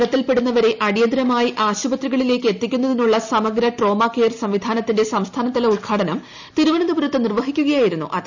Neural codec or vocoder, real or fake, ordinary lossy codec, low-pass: none; real; none; 7.2 kHz